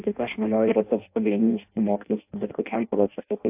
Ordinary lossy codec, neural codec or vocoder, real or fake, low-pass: AAC, 32 kbps; codec, 16 kHz in and 24 kHz out, 0.6 kbps, FireRedTTS-2 codec; fake; 3.6 kHz